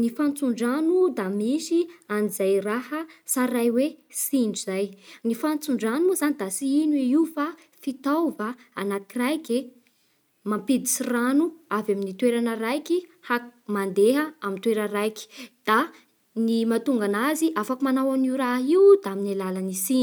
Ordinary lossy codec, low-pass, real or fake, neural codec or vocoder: none; none; real; none